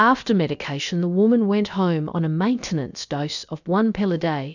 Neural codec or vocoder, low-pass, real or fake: codec, 16 kHz, about 1 kbps, DyCAST, with the encoder's durations; 7.2 kHz; fake